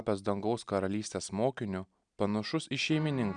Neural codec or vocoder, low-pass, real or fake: none; 9.9 kHz; real